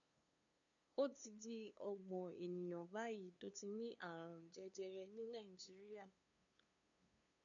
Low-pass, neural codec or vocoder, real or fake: 7.2 kHz; codec, 16 kHz, 2 kbps, FunCodec, trained on LibriTTS, 25 frames a second; fake